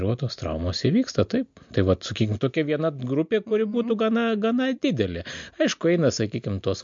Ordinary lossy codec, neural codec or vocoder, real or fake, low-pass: MP3, 48 kbps; none; real; 7.2 kHz